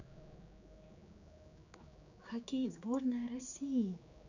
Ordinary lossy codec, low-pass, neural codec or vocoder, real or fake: none; 7.2 kHz; codec, 16 kHz, 4 kbps, X-Codec, HuBERT features, trained on general audio; fake